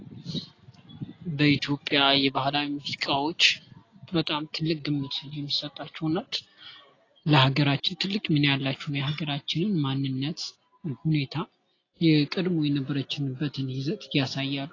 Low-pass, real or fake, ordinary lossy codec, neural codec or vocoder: 7.2 kHz; real; AAC, 32 kbps; none